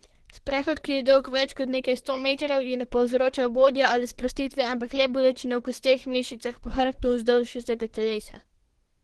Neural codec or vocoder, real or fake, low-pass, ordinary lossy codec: codec, 24 kHz, 1 kbps, SNAC; fake; 10.8 kHz; Opus, 16 kbps